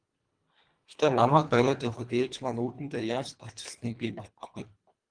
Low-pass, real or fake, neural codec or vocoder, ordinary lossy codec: 9.9 kHz; fake; codec, 24 kHz, 1.5 kbps, HILCodec; Opus, 32 kbps